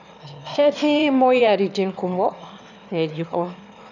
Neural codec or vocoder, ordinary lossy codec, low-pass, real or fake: autoencoder, 22.05 kHz, a latent of 192 numbers a frame, VITS, trained on one speaker; none; 7.2 kHz; fake